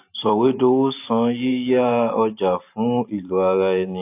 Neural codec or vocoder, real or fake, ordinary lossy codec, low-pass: vocoder, 44.1 kHz, 128 mel bands every 512 samples, BigVGAN v2; fake; AAC, 32 kbps; 3.6 kHz